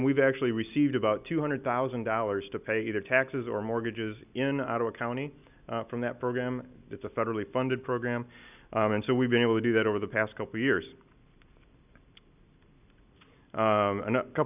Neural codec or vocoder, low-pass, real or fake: none; 3.6 kHz; real